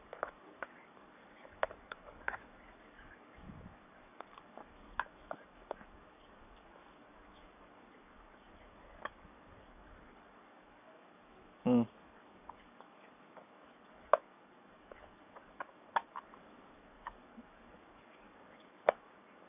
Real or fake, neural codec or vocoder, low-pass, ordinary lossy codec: fake; codec, 44.1 kHz, 7.8 kbps, DAC; 3.6 kHz; none